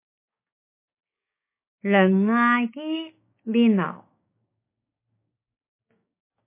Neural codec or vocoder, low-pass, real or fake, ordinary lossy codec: codec, 16 kHz, 6 kbps, DAC; 3.6 kHz; fake; MP3, 24 kbps